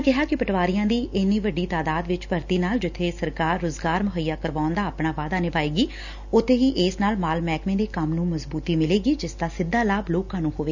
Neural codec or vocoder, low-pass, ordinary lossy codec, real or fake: none; 7.2 kHz; none; real